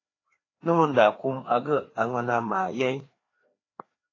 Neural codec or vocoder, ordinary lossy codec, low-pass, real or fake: codec, 16 kHz, 2 kbps, FreqCodec, larger model; AAC, 32 kbps; 7.2 kHz; fake